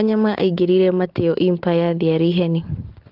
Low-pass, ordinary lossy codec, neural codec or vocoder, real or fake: 5.4 kHz; Opus, 16 kbps; none; real